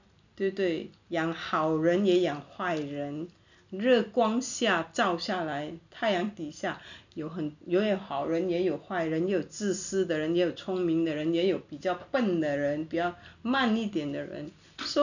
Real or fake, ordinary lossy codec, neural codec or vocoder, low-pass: real; none; none; 7.2 kHz